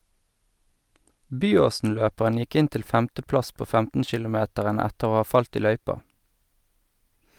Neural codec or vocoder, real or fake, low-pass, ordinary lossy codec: none; real; 19.8 kHz; Opus, 32 kbps